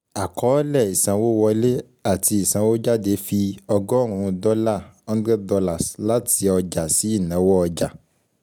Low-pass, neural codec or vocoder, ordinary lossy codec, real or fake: none; none; none; real